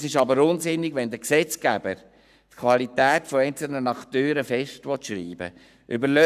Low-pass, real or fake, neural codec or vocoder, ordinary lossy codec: 14.4 kHz; fake; codec, 44.1 kHz, 7.8 kbps, Pupu-Codec; none